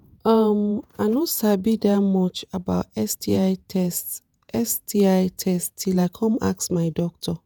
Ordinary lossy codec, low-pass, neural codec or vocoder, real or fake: none; none; vocoder, 48 kHz, 128 mel bands, Vocos; fake